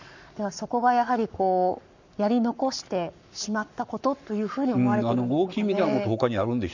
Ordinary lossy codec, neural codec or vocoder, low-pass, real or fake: none; codec, 44.1 kHz, 7.8 kbps, Pupu-Codec; 7.2 kHz; fake